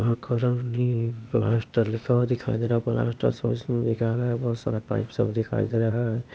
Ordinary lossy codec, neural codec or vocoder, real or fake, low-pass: none; codec, 16 kHz, 0.8 kbps, ZipCodec; fake; none